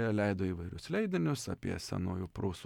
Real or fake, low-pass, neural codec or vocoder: real; 19.8 kHz; none